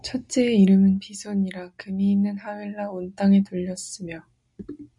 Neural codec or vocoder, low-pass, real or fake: none; 10.8 kHz; real